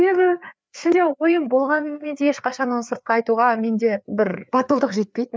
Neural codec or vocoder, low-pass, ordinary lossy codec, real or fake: codec, 16 kHz, 4 kbps, FreqCodec, larger model; none; none; fake